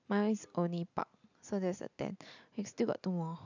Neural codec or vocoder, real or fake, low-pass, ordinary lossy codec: none; real; 7.2 kHz; none